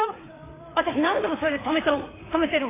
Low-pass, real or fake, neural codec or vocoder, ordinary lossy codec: 3.6 kHz; fake; codec, 16 kHz, 4 kbps, FreqCodec, larger model; AAC, 16 kbps